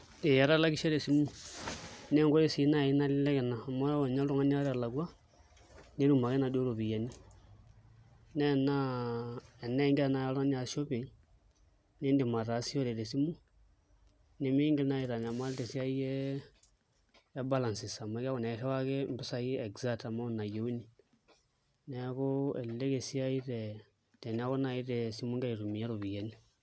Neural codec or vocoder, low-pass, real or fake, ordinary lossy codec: none; none; real; none